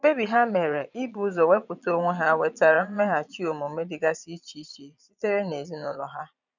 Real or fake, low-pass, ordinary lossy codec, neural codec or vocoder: fake; 7.2 kHz; none; vocoder, 22.05 kHz, 80 mel bands, Vocos